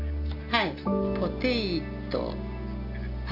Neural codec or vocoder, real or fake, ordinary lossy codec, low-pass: none; real; none; 5.4 kHz